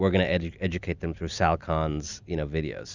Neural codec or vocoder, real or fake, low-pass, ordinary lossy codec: none; real; 7.2 kHz; Opus, 64 kbps